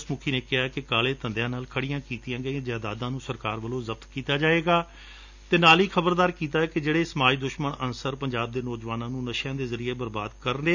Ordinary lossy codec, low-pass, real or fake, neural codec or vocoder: none; 7.2 kHz; real; none